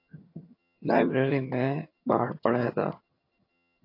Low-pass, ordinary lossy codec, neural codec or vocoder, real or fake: 5.4 kHz; AAC, 32 kbps; vocoder, 22.05 kHz, 80 mel bands, HiFi-GAN; fake